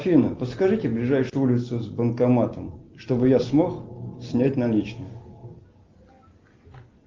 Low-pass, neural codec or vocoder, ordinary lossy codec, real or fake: 7.2 kHz; none; Opus, 32 kbps; real